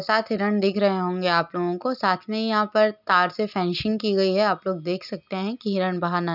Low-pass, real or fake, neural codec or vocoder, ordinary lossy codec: 5.4 kHz; real; none; none